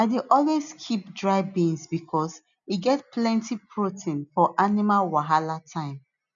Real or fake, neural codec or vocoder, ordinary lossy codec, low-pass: real; none; none; 7.2 kHz